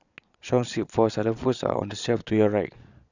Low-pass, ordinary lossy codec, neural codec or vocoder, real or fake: 7.2 kHz; none; vocoder, 22.05 kHz, 80 mel bands, Vocos; fake